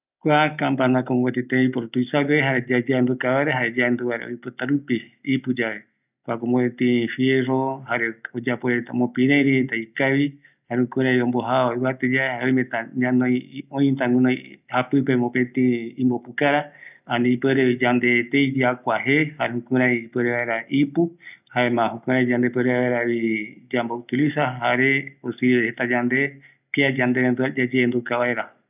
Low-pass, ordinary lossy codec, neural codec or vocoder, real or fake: 3.6 kHz; none; none; real